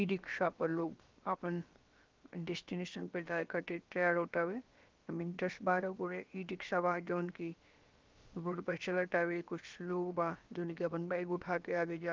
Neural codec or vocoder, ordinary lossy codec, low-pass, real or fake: codec, 16 kHz, about 1 kbps, DyCAST, with the encoder's durations; Opus, 32 kbps; 7.2 kHz; fake